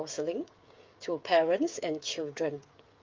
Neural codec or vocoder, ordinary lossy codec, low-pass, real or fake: codec, 16 kHz, 8 kbps, FreqCodec, smaller model; Opus, 24 kbps; 7.2 kHz; fake